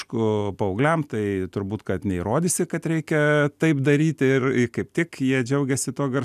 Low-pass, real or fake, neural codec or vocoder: 14.4 kHz; real; none